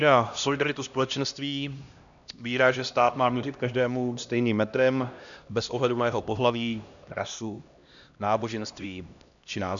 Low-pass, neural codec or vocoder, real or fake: 7.2 kHz; codec, 16 kHz, 1 kbps, X-Codec, HuBERT features, trained on LibriSpeech; fake